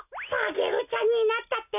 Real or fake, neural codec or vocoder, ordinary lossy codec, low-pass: real; none; none; 3.6 kHz